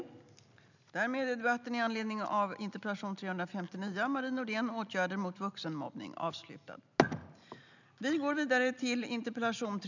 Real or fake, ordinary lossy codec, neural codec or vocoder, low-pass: real; none; none; 7.2 kHz